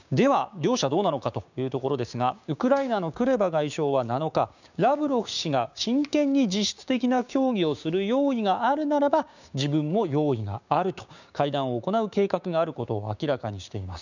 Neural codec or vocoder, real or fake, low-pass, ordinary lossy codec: codec, 16 kHz, 6 kbps, DAC; fake; 7.2 kHz; none